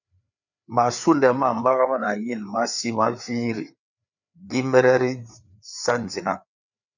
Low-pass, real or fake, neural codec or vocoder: 7.2 kHz; fake; codec, 16 kHz, 4 kbps, FreqCodec, larger model